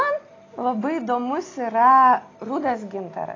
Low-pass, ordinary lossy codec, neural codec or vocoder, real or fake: 7.2 kHz; AAC, 32 kbps; none; real